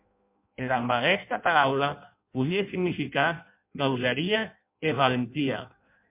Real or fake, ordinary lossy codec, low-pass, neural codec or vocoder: fake; MP3, 32 kbps; 3.6 kHz; codec, 16 kHz in and 24 kHz out, 0.6 kbps, FireRedTTS-2 codec